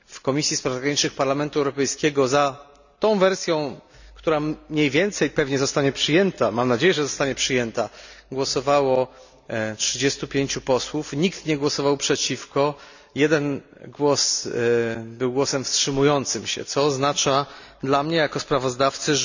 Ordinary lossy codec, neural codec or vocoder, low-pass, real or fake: none; none; 7.2 kHz; real